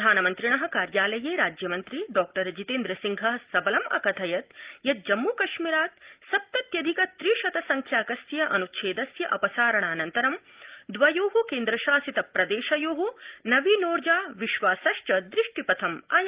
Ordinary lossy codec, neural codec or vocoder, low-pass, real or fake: Opus, 32 kbps; none; 3.6 kHz; real